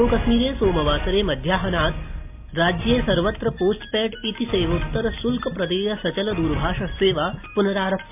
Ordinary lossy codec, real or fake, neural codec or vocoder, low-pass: MP3, 32 kbps; real; none; 3.6 kHz